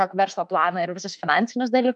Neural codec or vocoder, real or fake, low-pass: autoencoder, 48 kHz, 32 numbers a frame, DAC-VAE, trained on Japanese speech; fake; 10.8 kHz